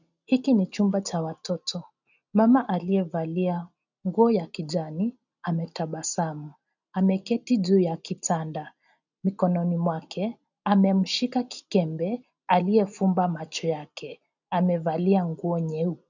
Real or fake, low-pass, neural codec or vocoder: real; 7.2 kHz; none